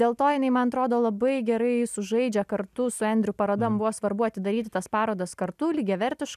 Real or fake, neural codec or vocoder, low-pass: real; none; 14.4 kHz